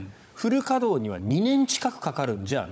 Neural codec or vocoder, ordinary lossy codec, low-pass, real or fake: codec, 16 kHz, 16 kbps, FunCodec, trained on Chinese and English, 50 frames a second; none; none; fake